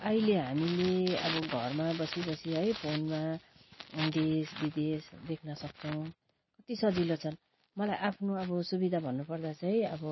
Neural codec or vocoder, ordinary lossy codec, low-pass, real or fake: none; MP3, 24 kbps; 7.2 kHz; real